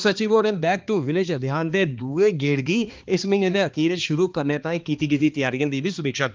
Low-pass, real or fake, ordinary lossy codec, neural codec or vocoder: 7.2 kHz; fake; Opus, 32 kbps; codec, 16 kHz, 2 kbps, X-Codec, HuBERT features, trained on balanced general audio